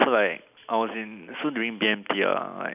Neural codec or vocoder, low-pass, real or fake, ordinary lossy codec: none; 3.6 kHz; real; none